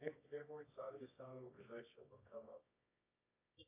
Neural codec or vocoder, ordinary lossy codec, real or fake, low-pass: codec, 24 kHz, 0.9 kbps, WavTokenizer, medium music audio release; AAC, 24 kbps; fake; 3.6 kHz